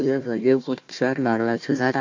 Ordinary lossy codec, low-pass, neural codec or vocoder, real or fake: MP3, 48 kbps; 7.2 kHz; codec, 16 kHz, 1 kbps, FunCodec, trained on Chinese and English, 50 frames a second; fake